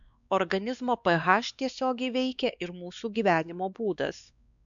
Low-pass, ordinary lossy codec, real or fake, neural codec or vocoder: 7.2 kHz; MP3, 96 kbps; fake; codec, 16 kHz, 2 kbps, X-Codec, WavLM features, trained on Multilingual LibriSpeech